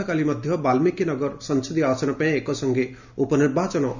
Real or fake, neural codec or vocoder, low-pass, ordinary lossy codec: real; none; 7.2 kHz; none